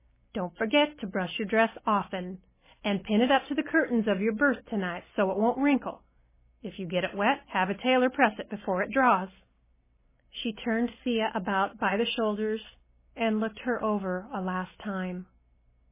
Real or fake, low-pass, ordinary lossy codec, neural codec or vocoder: real; 3.6 kHz; MP3, 16 kbps; none